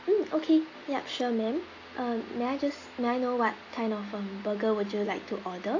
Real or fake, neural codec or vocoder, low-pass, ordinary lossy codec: real; none; 7.2 kHz; AAC, 32 kbps